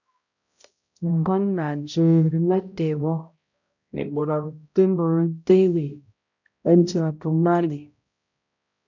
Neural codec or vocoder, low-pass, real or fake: codec, 16 kHz, 0.5 kbps, X-Codec, HuBERT features, trained on balanced general audio; 7.2 kHz; fake